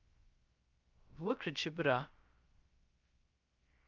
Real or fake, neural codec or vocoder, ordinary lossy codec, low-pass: fake; codec, 16 kHz, 0.3 kbps, FocalCodec; Opus, 24 kbps; 7.2 kHz